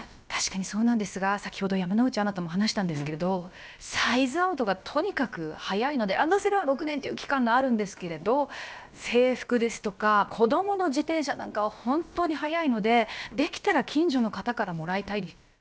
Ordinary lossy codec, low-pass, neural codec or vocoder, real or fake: none; none; codec, 16 kHz, about 1 kbps, DyCAST, with the encoder's durations; fake